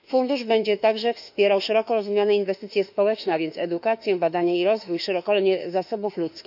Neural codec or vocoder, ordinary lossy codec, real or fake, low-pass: autoencoder, 48 kHz, 32 numbers a frame, DAC-VAE, trained on Japanese speech; none; fake; 5.4 kHz